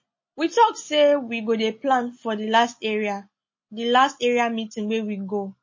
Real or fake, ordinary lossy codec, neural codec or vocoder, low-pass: real; MP3, 32 kbps; none; 7.2 kHz